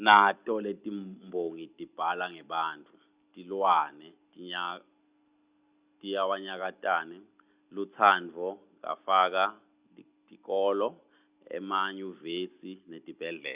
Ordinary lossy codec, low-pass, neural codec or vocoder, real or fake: Opus, 64 kbps; 3.6 kHz; none; real